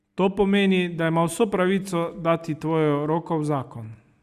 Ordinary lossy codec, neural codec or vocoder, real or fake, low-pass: Opus, 64 kbps; none; real; 14.4 kHz